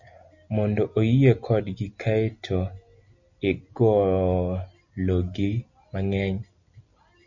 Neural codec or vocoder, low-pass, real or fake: none; 7.2 kHz; real